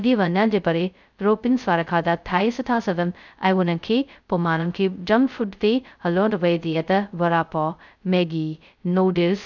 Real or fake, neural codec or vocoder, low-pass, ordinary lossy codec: fake; codec, 16 kHz, 0.2 kbps, FocalCodec; 7.2 kHz; none